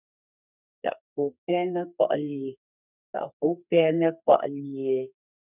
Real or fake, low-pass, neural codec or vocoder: fake; 3.6 kHz; codec, 32 kHz, 1.9 kbps, SNAC